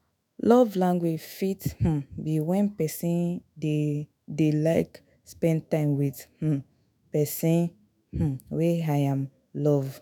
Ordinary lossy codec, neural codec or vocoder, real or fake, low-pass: none; autoencoder, 48 kHz, 128 numbers a frame, DAC-VAE, trained on Japanese speech; fake; none